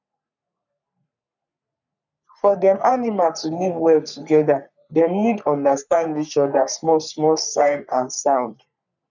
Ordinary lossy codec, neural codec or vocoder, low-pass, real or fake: none; codec, 44.1 kHz, 3.4 kbps, Pupu-Codec; 7.2 kHz; fake